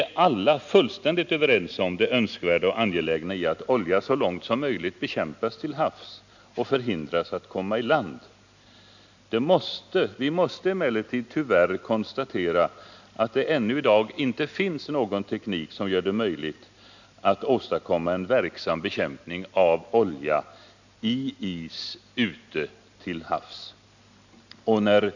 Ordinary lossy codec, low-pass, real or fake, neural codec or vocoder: none; 7.2 kHz; real; none